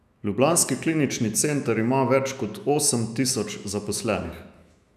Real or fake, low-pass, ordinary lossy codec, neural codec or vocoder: fake; 14.4 kHz; none; autoencoder, 48 kHz, 128 numbers a frame, DAC-VAE, trained on Japanese speech